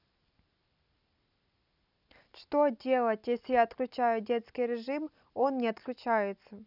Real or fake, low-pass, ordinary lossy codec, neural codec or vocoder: real; 5.4 kHz; none; none